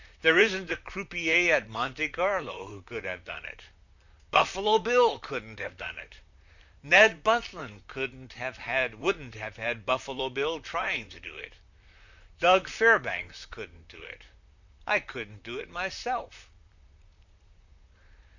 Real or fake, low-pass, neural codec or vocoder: fake; 7.2 kHz; vocoder, 44.1 kHz, 128 mel bands, Pupu-Vocoder